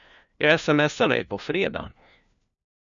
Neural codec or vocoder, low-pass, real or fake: codec, 16 kHz, 1 kbps, FunCodec, trained on LibriTTS, 50 frames a second; 7.2 kHz; fake